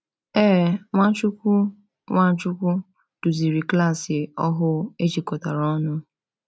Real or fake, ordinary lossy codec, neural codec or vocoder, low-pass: real; none; none; none